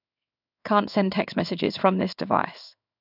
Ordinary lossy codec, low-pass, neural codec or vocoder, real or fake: none; 5.4 kHz; codec, 16 kHz in and 24 kHz out, 1 kbps, XY-Tokenizer; fake